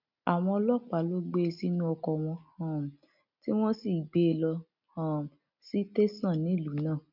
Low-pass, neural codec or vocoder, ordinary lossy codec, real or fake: 5.4 kHz; none; none; real